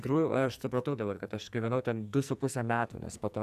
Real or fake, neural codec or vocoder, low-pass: fake; codec, 44.1 kHz, 2.6 kbps, SNAC; 14.4 kHz